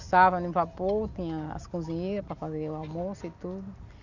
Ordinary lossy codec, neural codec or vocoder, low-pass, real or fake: none; none; 7.2 kHz; real